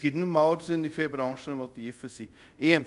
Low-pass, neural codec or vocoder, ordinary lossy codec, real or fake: 10.8 kHz; codec, 24 kHz, 0.5 kbps, DualCodec; MP3, 64 kbps; fake